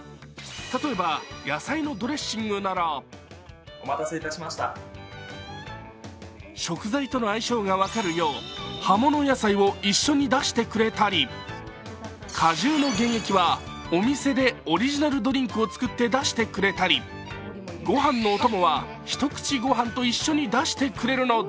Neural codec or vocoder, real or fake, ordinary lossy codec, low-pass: none; real; none; none